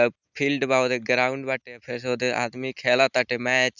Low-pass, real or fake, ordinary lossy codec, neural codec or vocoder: 7.2 kHz; real; none; none